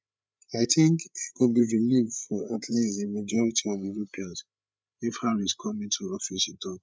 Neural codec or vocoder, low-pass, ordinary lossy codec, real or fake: codec, 16 kHz, 8 kbps, FreqCodec, larger model; none; none; fake